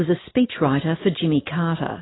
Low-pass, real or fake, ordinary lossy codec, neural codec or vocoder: 7.2 kHz; real; AAC, 16 kbps; none